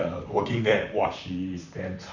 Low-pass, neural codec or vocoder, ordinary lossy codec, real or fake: 7.2 kHz; vocoder, 44.1 kHz, 128 mel bands, Pupu-Vocoder; none; fake